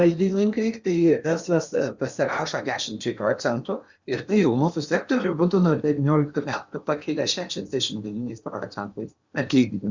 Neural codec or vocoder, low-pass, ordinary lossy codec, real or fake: codec, 16 kHz in and 24 kHz out, 0.8 kbps, FocalCodec, streaming, 65536 codes; 7.2 kHz; Opus, 64 kbps; fake